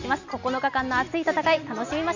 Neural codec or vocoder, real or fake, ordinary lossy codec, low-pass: none; real; none; 7.2 kHz